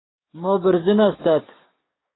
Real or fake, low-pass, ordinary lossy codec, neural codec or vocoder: fake; 7.2 kHz; AAC, 16 kbps; codec, 16 kHz, 6 kbps, DAC